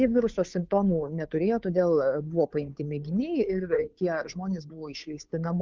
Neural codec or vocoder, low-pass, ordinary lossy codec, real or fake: codec, 16 kHz, 2 kbps, FunCodec, trained on Chinese and English, 25 frames a second; 7.2 kHz; Opus, 24 kbps; fake